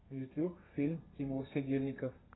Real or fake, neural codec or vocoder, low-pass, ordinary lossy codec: fake; codec, 32 kHz, 1.9 kbps, SNAC; 7.2 kHz; AAC, 16 kbps